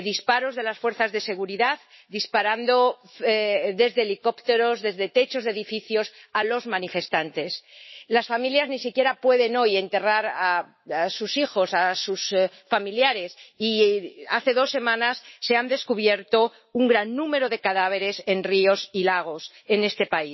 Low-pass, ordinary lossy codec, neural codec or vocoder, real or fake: 7.2 kHz; MP3, 24 kbps; autoencoder, 48 kHz, 128 numbers a frame, DAC-VAE, trained on Japanese speech; fake